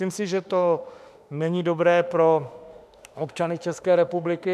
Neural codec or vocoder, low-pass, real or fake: autoencoder, 48 kHz, 32 numbers a frame, DAC-VAE, trained on Japanese speech; 14.4 kHz; fake